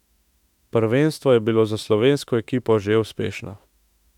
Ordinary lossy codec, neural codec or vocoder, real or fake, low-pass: none; autoencoder, 48 kHz, 32 numbers a frame, DAC-VAE, trained on Japanese speech; fake; 19.8 kHz